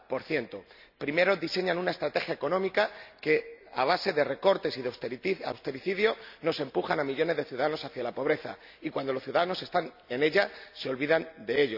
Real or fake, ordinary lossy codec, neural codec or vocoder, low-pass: real; none; none; 5.4 kHz